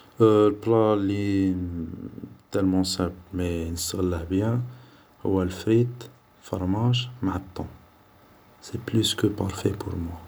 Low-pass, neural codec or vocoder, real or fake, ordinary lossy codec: none; none; real; none